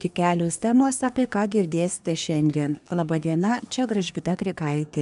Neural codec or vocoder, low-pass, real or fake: codec, 24 kHz, 1 kbps, SNAC; 10.8 kHz; fake